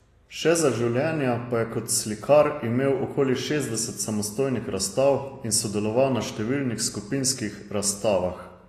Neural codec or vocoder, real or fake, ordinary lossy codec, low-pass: none; real; AAC, 48 kbps; 14.4 kHz